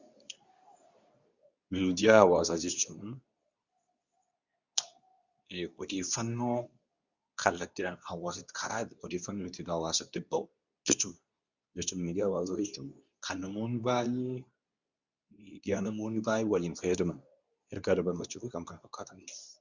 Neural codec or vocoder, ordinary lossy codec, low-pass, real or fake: codec, 24 kHz, 0.9 kbps, WavTokenizer, medium speech release version 2; Opus, 64 kbps; 7.2 kHz; fake